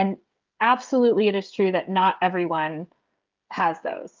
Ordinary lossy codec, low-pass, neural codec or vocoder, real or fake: Opus, 32 kbps; 7.2 kHz; codec, 16 kHz, 16 kbps, FreqCodec, smaller model; fake